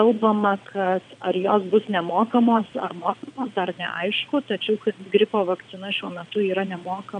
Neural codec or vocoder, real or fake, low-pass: vocoder, 22.05 kHz, 80 mel bands, WaveNeXt; fake; 9.9 kHz